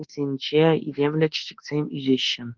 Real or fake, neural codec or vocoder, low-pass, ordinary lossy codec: fake; codec, 24 kHz, 1.2 kbps, DualCodec; 7.2 kHz; Opus, 16 kbps